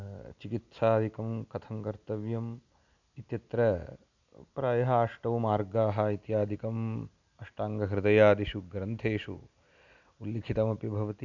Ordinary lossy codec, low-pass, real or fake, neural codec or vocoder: none; 7.2 kHz; real; none